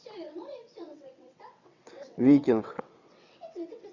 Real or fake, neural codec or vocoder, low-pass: fake; vocoder, 22.05 kHz, 80 mel bands, WaveNeXt; 7.2 kHz